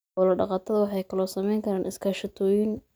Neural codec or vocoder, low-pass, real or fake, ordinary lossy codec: none; none; real; none